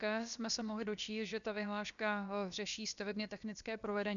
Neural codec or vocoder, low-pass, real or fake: codec, 16 kHz, about 1 kbps, DyCAST, with the encoder's durations; 7.2 kHz; fake